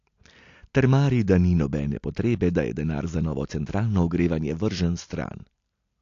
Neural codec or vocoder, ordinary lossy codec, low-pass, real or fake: none; AAC, 48 kbps; 7.2 kHz; real